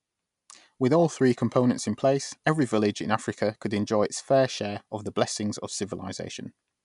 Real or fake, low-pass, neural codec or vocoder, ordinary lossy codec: real; 10.8 kHz; none; MP3, 96 kbps